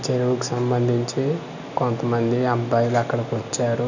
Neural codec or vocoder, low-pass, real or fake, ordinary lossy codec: none; 7.2 kHz; real; none